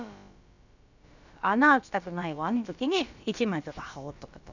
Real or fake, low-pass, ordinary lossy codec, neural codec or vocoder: fake; 7.2 kHz; none; codec, 16 kHz, about 1 kbps, DyCAST, with the encoder's durations